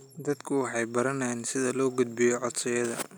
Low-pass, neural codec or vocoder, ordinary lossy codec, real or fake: none; none; none; real